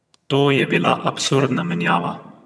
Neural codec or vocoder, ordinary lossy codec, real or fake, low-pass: vocoder, 22.05 kHz, 80 mel bands, HiFi-GAN; none; fake; none